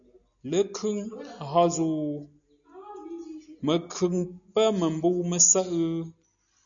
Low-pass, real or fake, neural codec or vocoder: 7.2 kHz; real; none